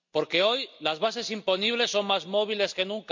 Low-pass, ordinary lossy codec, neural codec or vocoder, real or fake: 7.2 kHz; MP3, 48 kbps; none; real